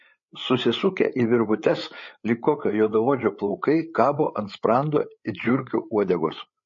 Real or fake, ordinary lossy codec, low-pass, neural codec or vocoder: fake; MP3, 32 kbps; 7.2 kHz; codec, 16 kHz, 8 kbps, FreqCodec, larger model